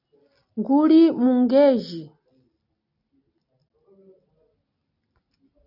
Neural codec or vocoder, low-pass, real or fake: none; 5.4 kHz; real